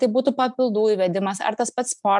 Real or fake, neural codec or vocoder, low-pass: real; none; 10.8 kHz